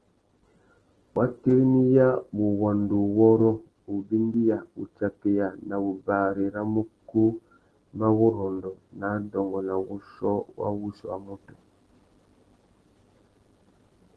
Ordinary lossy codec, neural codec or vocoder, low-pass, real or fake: Opus, 16 kbps; none; 10.8 kHz; real